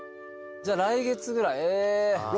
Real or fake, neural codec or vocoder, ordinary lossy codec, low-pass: real; none; none; none